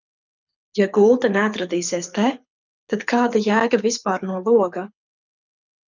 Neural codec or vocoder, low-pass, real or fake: codec, 24 kHz, 6 kbps, HILCodec; 7.2 kHz; fake